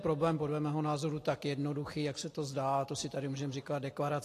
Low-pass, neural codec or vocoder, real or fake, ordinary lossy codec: 14.4 kHz; none; real; AAC, 48 kbps